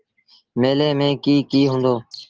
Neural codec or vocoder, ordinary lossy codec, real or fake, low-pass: none; Opus, 16 kbps; real; 7.2 kHz